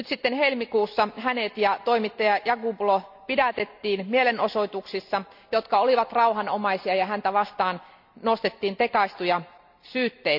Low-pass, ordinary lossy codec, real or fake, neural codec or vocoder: 5.4 kHz; none; real; none